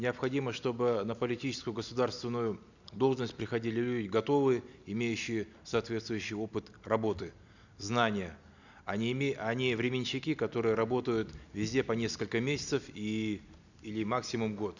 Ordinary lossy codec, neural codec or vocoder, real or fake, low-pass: none; none; real; 7.2 kHz